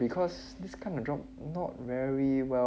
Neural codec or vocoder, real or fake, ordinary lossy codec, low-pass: none; real; none; none